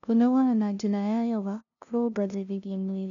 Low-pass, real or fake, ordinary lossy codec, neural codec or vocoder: 7.2 kHz; fake; none; codec, 16 kHz, 0.5 kbps, FunCodec, trained on LibriTTS, 25 frames a second